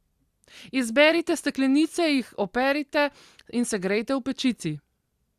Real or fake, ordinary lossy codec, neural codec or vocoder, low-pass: fake; Opus, 64 kbps; vocoder, 44.1 kHz, 128 mel bands every 256 samples, BigVGAN v2; 14.4 kHz